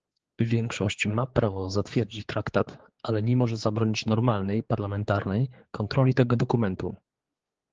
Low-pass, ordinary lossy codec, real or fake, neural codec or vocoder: 7.2 kHz; Opus, 16 kbps; fake; codec, 16 kHz, 4 kbps, X-Codec, HuBERT features, trained on general audio